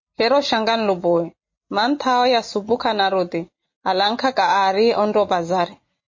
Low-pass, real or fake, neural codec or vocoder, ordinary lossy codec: 7.2 kHz; real; none; MP3, 32 kbps